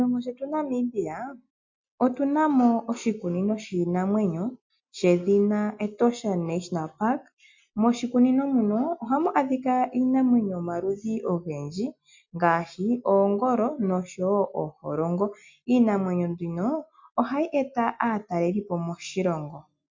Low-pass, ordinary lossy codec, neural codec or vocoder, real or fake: 7.2 kHz; MP3, 48 kbps; none; real